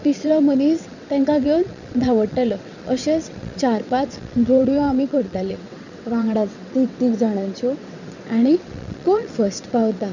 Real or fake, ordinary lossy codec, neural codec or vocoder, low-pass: fake; none; vocoder, 22.05 kHz, 80 mel bands, WaveNeXt; 7.2 kHz